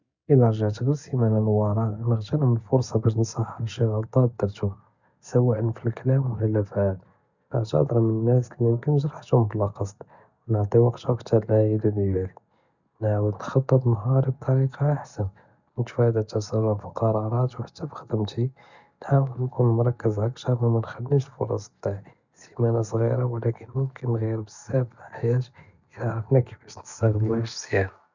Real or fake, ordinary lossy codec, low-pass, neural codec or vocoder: real; none; 7.2 kHz; none